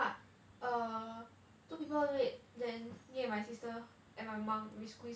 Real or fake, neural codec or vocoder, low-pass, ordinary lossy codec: real; none; none; none